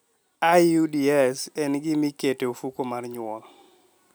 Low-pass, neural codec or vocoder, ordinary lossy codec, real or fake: none; none; none; real